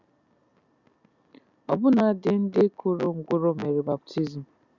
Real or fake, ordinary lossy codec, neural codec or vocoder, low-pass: real; Opus, 64 kbps; none; 7.2 kHz